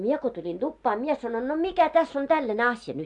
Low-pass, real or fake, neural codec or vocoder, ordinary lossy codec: 10.8 kHz; real; none; AAC, 64 kbps